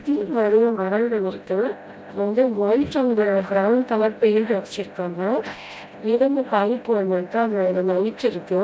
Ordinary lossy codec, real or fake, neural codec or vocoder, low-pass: none; fake; codec, 16 kHz, 0.5 kbps, FreqCodec, smaller model; none